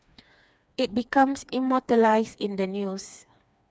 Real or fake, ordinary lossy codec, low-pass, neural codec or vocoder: fake; none; none; codec, 16 kHz, 4 kbps, FreqCodec, smaller model